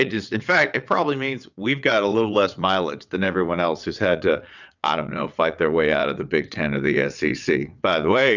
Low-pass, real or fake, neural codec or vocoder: 7.2 kHz; fake; vocoder, 22.05 kHz, 80 mel bands, WaveNeXt